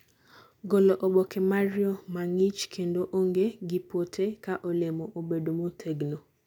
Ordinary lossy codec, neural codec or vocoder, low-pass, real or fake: none; none; 19.8 kHz; real